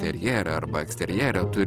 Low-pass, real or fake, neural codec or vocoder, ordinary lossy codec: 14.4 kHz; real; none; Opus, 16 kbps